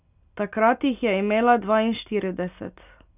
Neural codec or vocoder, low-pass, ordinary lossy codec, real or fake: none; 3.6 kHz; none; real